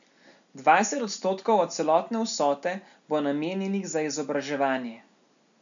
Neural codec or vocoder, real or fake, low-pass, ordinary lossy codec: none; real; 7.2 kHz; none